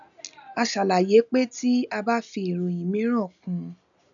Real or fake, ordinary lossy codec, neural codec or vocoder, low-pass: real; none; none; 7.2 kHz